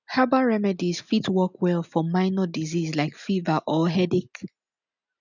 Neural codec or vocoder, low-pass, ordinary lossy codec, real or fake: none; 7.2 kHz; none; real